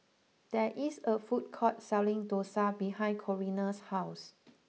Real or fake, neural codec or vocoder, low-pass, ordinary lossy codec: real; none; none; none